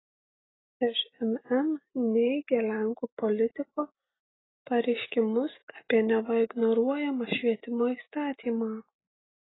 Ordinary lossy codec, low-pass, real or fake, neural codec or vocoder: AAC, 16 kbps; 7.2 kHz; real; none